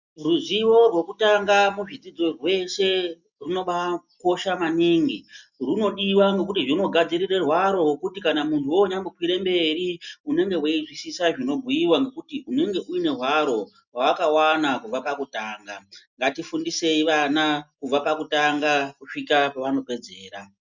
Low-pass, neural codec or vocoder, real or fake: 7.2 kHz; none; real